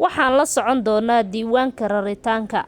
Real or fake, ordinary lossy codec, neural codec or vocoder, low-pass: real; Opus, 64 kbps; none; 19.8 kHz